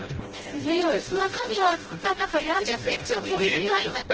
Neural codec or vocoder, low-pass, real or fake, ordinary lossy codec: codec, 16 kHz, 0.5 kbps, FreqCodec, smaller model; 7.2 kHz; fake; Opus, 16 kbps